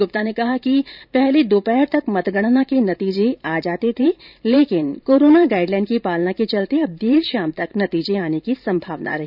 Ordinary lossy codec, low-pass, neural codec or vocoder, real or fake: none; 5.4 kHz; vocoder, 44.1 kHz, 128 mel bands every 512 samples, BigVGAN v2; fake